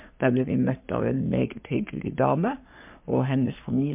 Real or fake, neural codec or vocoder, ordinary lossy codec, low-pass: fake; codec, 44.1 kHz, 3.4 kbps, Pupu-Codec; MP3, 32 kbps; 3.6 kHz